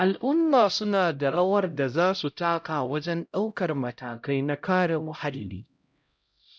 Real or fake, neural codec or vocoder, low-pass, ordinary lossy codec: fake; codec, 16 kHz, 0.5 kbps, X-Codec, HuBERT features, trained on LibriSpeech; none; none